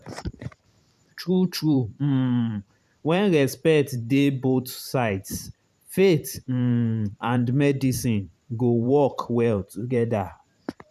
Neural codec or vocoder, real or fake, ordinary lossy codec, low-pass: vocoder, 44.1 kHz, 128 mel bands every 512 samples, BigVGAN v2; fake; none; 14.4 kHz